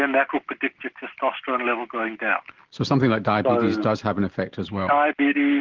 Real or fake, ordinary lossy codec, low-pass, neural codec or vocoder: real; Opus, 16 kbps; 7.2 kHz; none